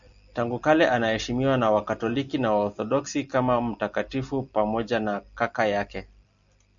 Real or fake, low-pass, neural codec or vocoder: real; 7.2 kHz; none